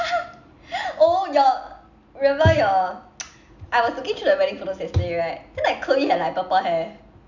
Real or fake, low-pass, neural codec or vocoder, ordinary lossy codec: real; 7.2 kHz; none; none